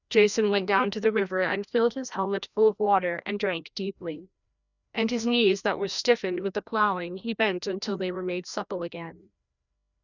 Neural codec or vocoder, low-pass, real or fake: codec, 16 kHz, 1 kbps, FreqCodec, larger model; 7.2 kHz; fake